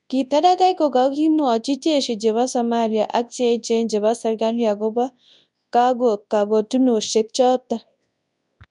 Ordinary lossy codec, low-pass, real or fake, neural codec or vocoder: none; 10.8 kHz; fake; codec, 24 kHz, 0.9 kbps, WavTokenizer, large speech release